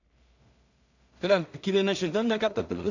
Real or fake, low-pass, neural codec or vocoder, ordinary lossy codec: fake; 7.2 kHz; codec, 16 kHz in and 24 kHz out, 0.4 kbps, LongCat-Audio-Codec, two codebook decoder; none